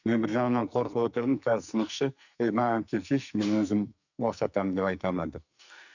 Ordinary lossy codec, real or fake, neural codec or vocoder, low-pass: none; fake; codec, 44.1 kHz, 2.6 kbps, SNAC; 7.2 kHz